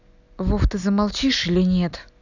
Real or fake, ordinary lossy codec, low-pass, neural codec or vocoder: real; none; 7.2 kHz; none